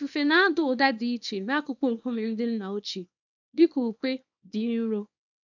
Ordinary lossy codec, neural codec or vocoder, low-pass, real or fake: none; codec, 24 kHz, 0.9 kbps, WavTokenizer, small release; 7.2 kHz; fake